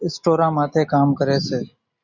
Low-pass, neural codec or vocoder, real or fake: 7.2 kHz; none; real